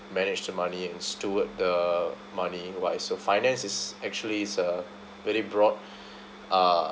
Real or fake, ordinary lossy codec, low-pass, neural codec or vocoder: real; none; none; none